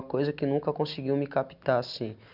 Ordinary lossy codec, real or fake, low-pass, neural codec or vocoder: none; real; 5.4 kHz; none